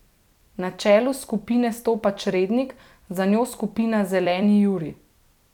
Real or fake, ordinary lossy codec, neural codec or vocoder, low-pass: fake; none; vocoder, 44.1 kHz, 128 mel bands every 256 samples, BigVGAN v2; 19.8 kHz